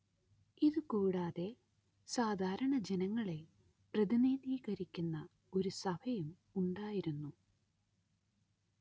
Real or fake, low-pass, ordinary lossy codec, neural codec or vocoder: real; none; none; none